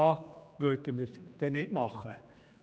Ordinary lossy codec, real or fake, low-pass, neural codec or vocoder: none; fake; none; codec, 16 kHz, 2 kbps, X-Codec, HuBERT features, trained on general audio